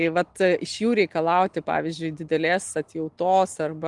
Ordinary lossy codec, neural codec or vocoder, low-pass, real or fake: Opus, 24 kbps; none; 10.8 kHz; real